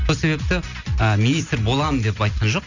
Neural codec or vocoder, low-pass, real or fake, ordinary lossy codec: none; 7.2 kHz; real; none